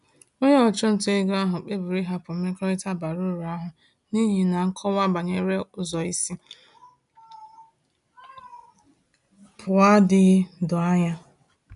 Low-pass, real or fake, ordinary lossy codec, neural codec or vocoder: 10.8 kHz; real; none; none